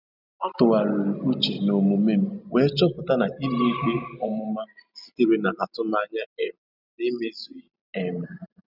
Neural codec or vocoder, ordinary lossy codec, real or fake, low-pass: none; none; real; 5.4 kHz